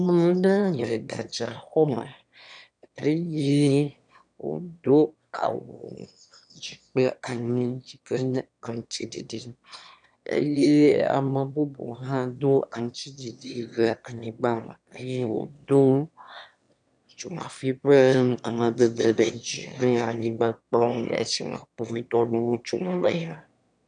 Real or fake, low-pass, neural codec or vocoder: fake; 9.9 kHz; autoencoder, 22.05 kHz, a latent of 192 numbers a frame, VITS, trained on one speaker